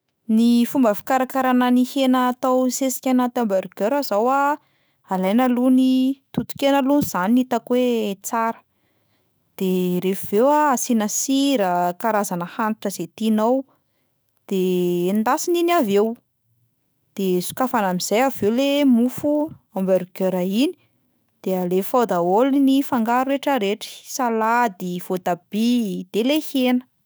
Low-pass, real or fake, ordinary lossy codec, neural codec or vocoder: none; fake; none; autoencoder, 48 kHz, 128 numbers a frame, DAC-VAE, trained on Japanese speech